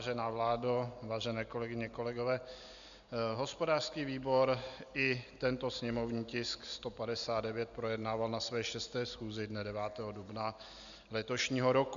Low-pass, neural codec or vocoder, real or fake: 7.2 kHz; none; real